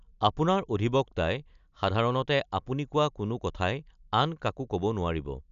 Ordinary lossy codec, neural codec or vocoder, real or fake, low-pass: none; none; real; 7.2 kHz